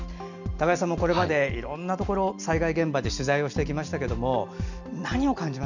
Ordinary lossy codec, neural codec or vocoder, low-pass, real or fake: none; none; 7.2 kHz; real